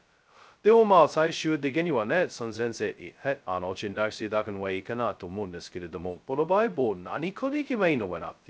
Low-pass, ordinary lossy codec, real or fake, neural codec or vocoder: none; none; fake; codec, 16 kHz, 0.2 kbps, FocalCodec